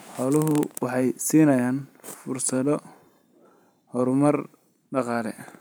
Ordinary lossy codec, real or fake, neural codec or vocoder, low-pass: none; fake; vocoder, 44.1 kHz, 128 mel bands every 512 samples, BigVGAN v2; none